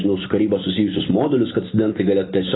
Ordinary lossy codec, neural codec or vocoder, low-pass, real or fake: AAC, 16 kbps; none; 7.2 kHz; real